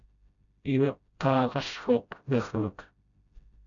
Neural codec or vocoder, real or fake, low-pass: codec, 16 kHz, 0.5 kbps, FreqCodec, smaller model; fake; 7.2 kHz